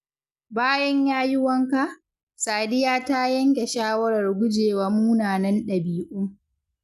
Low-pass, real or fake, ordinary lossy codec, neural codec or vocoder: 14.4 kHz; real; none; none